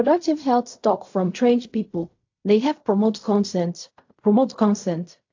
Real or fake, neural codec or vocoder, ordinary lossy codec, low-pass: fake; codec, 16 kHz in and 24 kHz out, 0.4 kbps, LongCat-Audio-Codec, fine tuned four codebook decoder; AAC, 48 kbps; 7.2 kHz